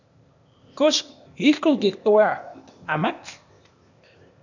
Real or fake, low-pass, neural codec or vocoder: fake; 7.2 kHz; codec, 16 kHz, 0.8 kbps, ZipCodec